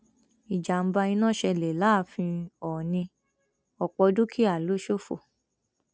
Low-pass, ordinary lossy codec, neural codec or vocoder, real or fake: none; none; none; real